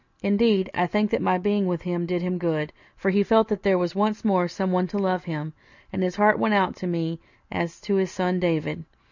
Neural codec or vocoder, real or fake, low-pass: none; real; 7.2 kHz